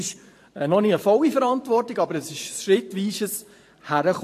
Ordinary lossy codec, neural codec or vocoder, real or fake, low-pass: AAC, 64 kbps; vocoder, 44.1 kHz, 128 mel bands every 512 samples, BigVGAN v2; fake; 14.4 kHz